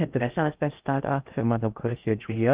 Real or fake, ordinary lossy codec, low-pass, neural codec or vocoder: fake; Opus, 24 kbps; 3.6 kHz; codec, 16 kHz in and 24 kHz out, 0.6 kbps, FocalCodec, streaming, 2048 codes